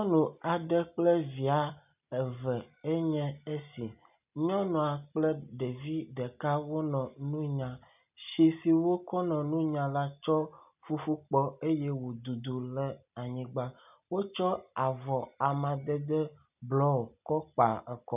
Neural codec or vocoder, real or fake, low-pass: none; real; 3.6 kHz